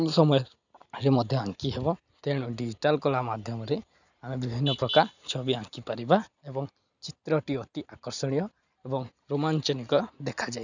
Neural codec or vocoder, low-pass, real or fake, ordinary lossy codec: none; 7.2 kHz; real; none